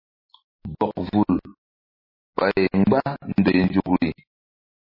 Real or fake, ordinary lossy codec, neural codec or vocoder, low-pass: real; MP3, 24 kbps; none; 5.4 kHz